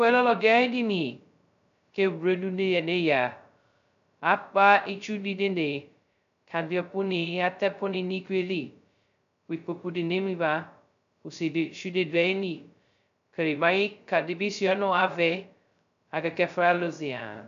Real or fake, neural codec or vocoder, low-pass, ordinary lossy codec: fake; codec, 16 kHz, 0.2 kbps, FocalCodec; 7.2 kHz; AAC, 96 kbps